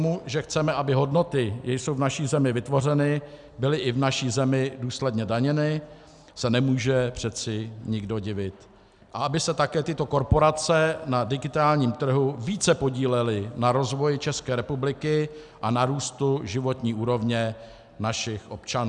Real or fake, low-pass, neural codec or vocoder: real; 10.8 kHz; none